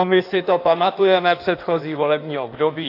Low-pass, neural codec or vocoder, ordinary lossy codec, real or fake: 5.4 kHz; codec, 16 kHz in and 24 kHz out, 1.1 kbps, FireRedTTS-2 codec; AAC, 32 kbps; fake